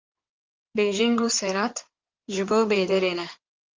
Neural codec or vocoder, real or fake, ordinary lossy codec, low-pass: codec, 16 kHz in and 24 kHz out, 2.2 kbps, FireRedTTS-2 codec; fake; Opus, 32 kbps; 7.2 kHz